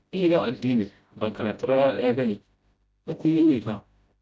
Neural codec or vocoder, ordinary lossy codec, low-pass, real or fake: codec, 16 kHz, 0.5 kbps, FreqCodec, smaller model; none; none; fake